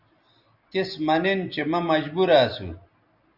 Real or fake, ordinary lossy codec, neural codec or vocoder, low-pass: real; Opus, 64 kbps; none; 5.4 kHz